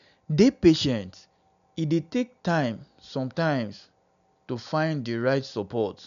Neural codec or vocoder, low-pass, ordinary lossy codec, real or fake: none; 7.2 kHz; none; real